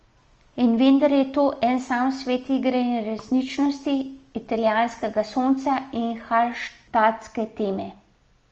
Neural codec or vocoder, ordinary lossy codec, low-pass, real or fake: none; Opus, 24 kbps; 7.2 kHz; real